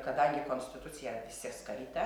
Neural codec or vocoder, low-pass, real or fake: none; 19.8 kHz; real